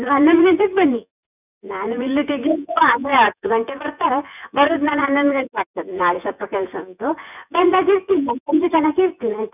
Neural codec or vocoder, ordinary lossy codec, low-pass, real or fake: vocoder, 24 kHz, 100 mel bands, Vocos; none; 3.6 kHz; fake